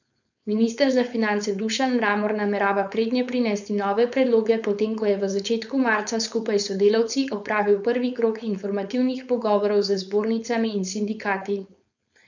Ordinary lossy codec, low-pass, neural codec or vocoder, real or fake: none; 7.2 kHz; codec, 16 kHz, 4.8 kbps, FACodec; fake